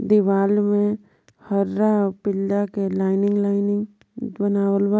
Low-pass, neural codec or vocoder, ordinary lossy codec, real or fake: none; none; none; real